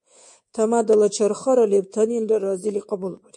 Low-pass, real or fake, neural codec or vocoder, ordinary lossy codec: 10.8 kHz; fake; codec, 24 kHz, 3.1 kbps, DualCodec; MP3, 48 kbps